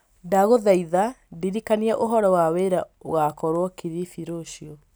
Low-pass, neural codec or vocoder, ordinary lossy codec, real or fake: none; none; none; real